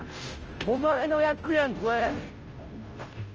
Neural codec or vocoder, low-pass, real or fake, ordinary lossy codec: codec, 16 kHz, 0.5 kbps, FunCodec, trained on Chinese and English, 25 frames a second; 7.2 kHz; fake; Opus, 24 kbps